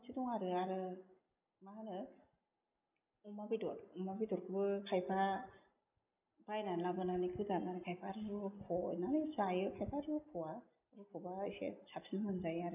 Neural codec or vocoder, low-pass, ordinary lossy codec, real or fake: none; 3.6 kHz; none; real